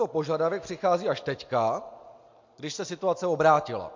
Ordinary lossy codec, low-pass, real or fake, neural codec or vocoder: MP3, 48 kbps; 7.2 kHz; real; none